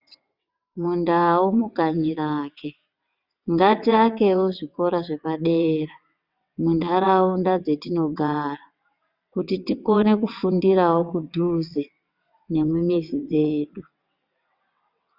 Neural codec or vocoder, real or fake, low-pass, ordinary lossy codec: vocoder, 22.05 kHz, 80 mel bands, WaveNeXt; fake; 5.4 kHz; Opus, 64 kbps